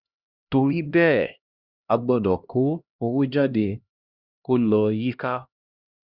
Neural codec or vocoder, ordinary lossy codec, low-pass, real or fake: codec, 16 kHz, 1 kbps, X-Codec, HuBERT features, trained on LibriSpeech; Opus, 64 kbps; 5.4 kHz; fake